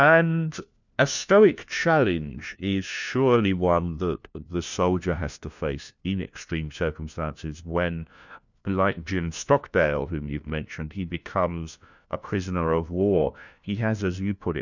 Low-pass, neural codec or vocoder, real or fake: 7.2 kHz; codec, 16 kHz, 1 kbps, FunCodec, trained on LibriTTS, 50 frames a second; fake